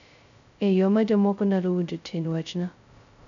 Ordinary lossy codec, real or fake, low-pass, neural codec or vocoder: none; fake; 7.2 kHz; codec, 16 kHz, 0.2 kbps, FocalCodec